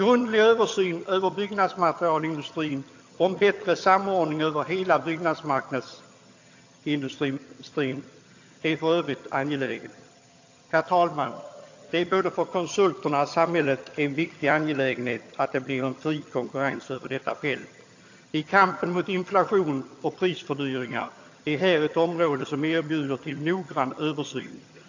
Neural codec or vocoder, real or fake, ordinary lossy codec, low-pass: vocoder, 22.05 kHz, 80 mel bands, HiFi-GAN; fake; AAC, 48 kbps; 7.2 kHz